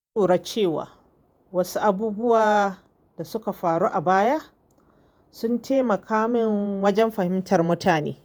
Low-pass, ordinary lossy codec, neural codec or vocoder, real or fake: none; none; vocoder, 48 kHz, 128 mel bands, Vocos; fake